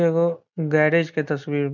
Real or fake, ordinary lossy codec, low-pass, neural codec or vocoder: real; none; 7.2 kHz; none